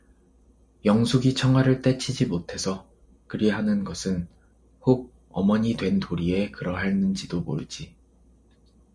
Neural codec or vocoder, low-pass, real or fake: none; 9.9 kHz; real